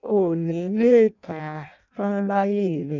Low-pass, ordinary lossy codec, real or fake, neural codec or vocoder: 7.2 kHz; none; fake; codec, 16 kHz in and 24 kHz out, 0.6 kbps, FireRedTTS-2 codec